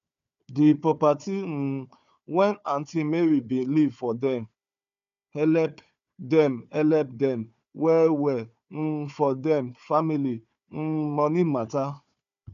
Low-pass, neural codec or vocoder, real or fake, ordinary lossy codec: 7.2 kHz; codec, 16 kHz, 4 kbps, FunCodec, trained on Chinese and English, 50 frames a second; fake; none